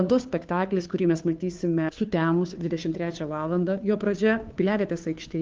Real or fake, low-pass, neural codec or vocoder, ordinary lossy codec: fake; 7.2 kHz; codec, 16 kHz, 2 kbps, FunCodec, trained on Chinese and English, 25 frames a second; Opus, 32 kbps